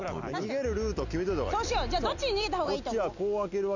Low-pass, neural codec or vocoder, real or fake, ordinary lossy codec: 7.2 kHz; none; real; none